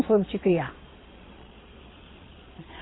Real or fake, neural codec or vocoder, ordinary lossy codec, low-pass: fake; vocoder, 22.05 kHz, 80 mel bands, Vocos; AAC, 16 kbps; 7.2 kHz